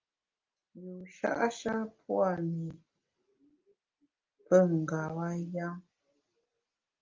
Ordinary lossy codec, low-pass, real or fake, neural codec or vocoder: Opus, 24 kbps; 7.2 kHz; real; none